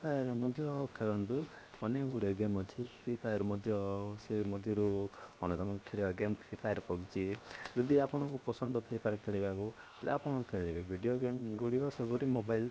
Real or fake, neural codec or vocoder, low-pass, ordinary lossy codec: fake; codec, 16 kHz, 0.7 kbps, FocalCodec; none; none